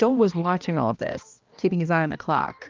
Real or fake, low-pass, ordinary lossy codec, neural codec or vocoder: fake; 7.2 kHz; Opus, 24 kbps; codec, 16 kHz, 1 kbps, X-Codec, HuBERT features, trained on balanced general audio